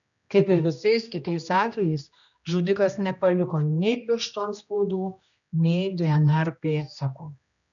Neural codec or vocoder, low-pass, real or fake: codec, 16 kHz, 1 kbps, X-Codec, HuBERT features, trained on general audio; 7.2 kHz; fake